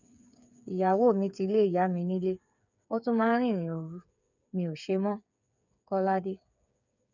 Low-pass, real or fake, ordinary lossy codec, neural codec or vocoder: 7.2 kHz; fake; none; codec, 16 kHz, 8 kbps, FreqCodec, smaller model